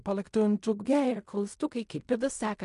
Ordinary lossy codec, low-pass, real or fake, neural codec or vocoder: MP3, 64 kbps; 10.8 kHz; fake; codec, 16 kHz in and 24 kHz out, 0.4 kbps, LongCat-Audio-Codec, fine tuned four codebook decoder